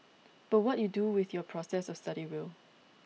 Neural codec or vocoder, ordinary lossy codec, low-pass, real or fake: none; none; none; real